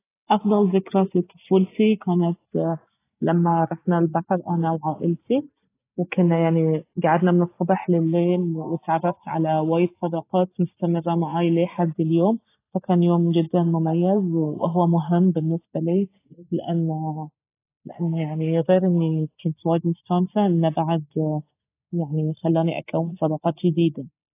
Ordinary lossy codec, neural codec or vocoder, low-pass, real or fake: AAC, 24 kbps; none; 3.6 kHz; real